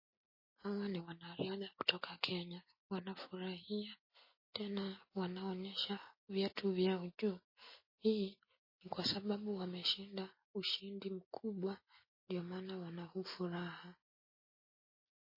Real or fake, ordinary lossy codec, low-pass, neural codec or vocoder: real; MP3, 24 kbps; 5.4 kHz; none